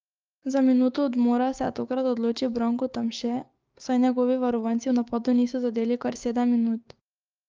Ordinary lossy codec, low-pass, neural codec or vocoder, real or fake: Opus, 24 kbps; 7.2 kHz; codec, 16 kHz, 6 kbps, DAC; fake